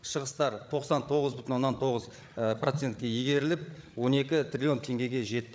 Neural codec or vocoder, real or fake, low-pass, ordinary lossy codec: codec, 16 kHz, 8 kbps, FreqCodec, larger model; fake; none; none